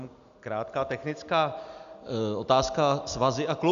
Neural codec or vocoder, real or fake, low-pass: none; real; 7.2 kHz